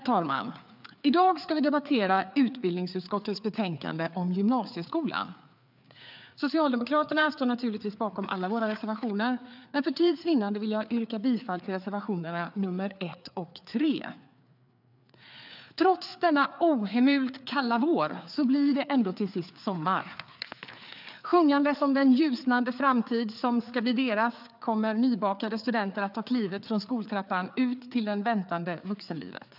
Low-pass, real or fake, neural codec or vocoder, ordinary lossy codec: 5.4 kHz; fake; codec, 16 kHz, 4 kbps, FreqCodec, larger model; none